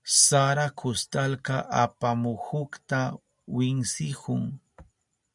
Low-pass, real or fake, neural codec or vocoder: 10.8 kHz; real; none